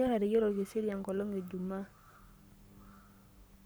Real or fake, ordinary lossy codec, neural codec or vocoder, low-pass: fake; none; codec, 44.1 kHz, 7.8 kbps, Pupu-Codec; none